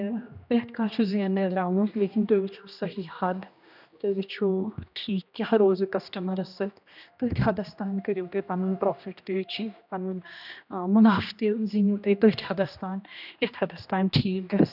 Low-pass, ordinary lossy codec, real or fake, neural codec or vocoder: 5.4 kHz; none; fake; codec, 16 kHz, 1 kbps, X-Codec, HuBERT features, trained on general audio